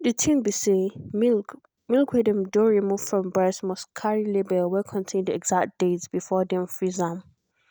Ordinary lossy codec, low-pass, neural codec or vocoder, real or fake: none; none; none; real